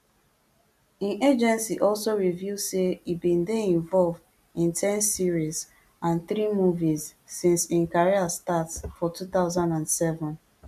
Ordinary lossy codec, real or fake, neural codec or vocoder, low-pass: MP3, 96 kbps; real; none; 14.4 kHz